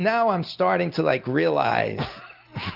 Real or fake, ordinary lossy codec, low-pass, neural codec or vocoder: real; Opus, 24 kbps; 5.4 kHz; none